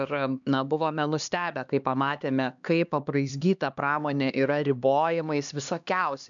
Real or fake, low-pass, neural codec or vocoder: fake; 7.2 kHz; codec, 16 kHz, 2 kbps, X-Codec, HuBERT features, trained on LibriSpeech